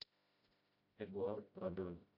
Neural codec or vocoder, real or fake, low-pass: codec, 16 kHz, 0.5 kbps, FreqCodec, smaller model; fake; 5.4 kHz